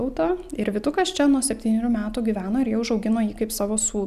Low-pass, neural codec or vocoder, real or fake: 14.4 kHz; none; real